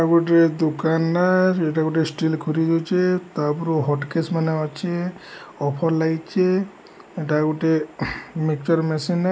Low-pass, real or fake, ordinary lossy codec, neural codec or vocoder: none; real; none; none